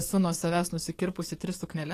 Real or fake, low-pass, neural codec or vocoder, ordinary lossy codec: fake; 14.4 kHz; codec, 44.1 kHz, 7.8 kbps, DAC; AAC, 48 kbps